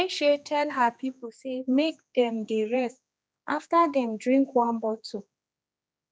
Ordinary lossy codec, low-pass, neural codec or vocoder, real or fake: none; none; codec, 16 kHz, 2 kbps, X-Codec, HuBERT features, trained on general audio; fake